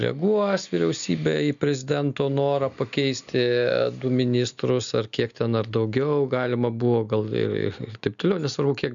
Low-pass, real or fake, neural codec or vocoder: 7.2 kHz; real; none